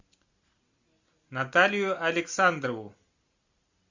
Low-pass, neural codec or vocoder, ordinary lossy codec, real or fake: 7.2 kHz; none; Opus, 64 kbps; real